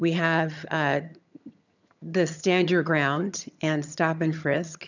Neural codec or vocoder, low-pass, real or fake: vocoder, 22.05 kHz, 80 mel bands, HiFi-GAN; 7.2 kHz; fake